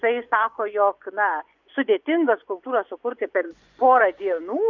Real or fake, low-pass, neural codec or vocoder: fake; 7.2 kHz; vocoder, 24 kHz, 100 mel bands, Vocos